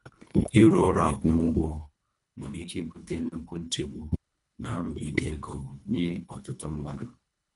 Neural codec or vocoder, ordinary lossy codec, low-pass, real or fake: codec, 24 kHz, 1.5 kbps, HILCodec; none; 10.8 kHz; fake